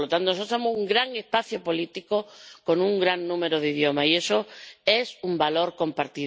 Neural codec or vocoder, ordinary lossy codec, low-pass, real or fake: none; none; none; real